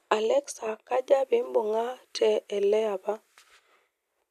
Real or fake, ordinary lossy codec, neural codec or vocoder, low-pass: real; none; none; 14.4 kHz